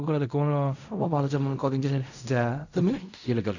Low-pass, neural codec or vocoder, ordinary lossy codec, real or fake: 7.2 kHz; codec, 16 kHz in and 24 kHz out, 0.4 kbps, LongCat-Audio-Codec, fine tuned four codebook decoder; none; fake